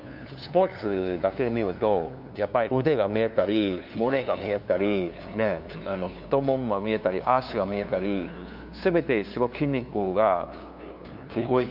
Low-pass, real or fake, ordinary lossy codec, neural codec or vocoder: 5.4 kHz; fake; none; codec, 16 kHz, 2 kbps, FunCodec, trained on LibriTTS, 25 frames a second